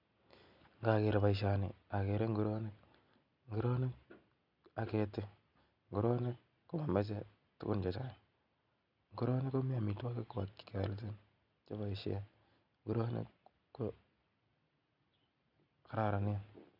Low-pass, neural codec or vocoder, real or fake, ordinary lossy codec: 5.4 kHz; none; real; none